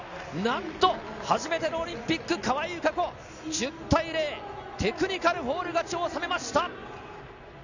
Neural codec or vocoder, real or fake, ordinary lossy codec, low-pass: none; real; none; 7.2 kHz